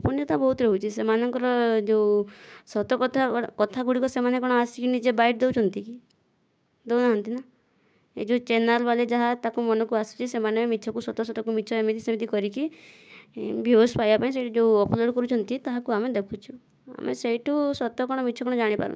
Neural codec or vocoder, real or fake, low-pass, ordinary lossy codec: codec, 16 kHz, 6 kbps, DAC; fake; none; none